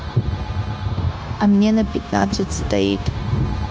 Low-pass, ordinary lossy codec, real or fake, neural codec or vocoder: none; none; fake; codec, 16 kHz, 0.9 kbps, LongCat-Audio-Codec